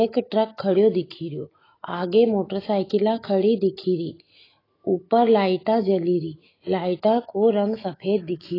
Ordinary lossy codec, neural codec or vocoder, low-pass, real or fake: AAC, 24 kbps; autoencoder, 48 kHz, 128 numbers a frame, DAC-VAE, trained on Japanese speech; 5.4 kHz; fake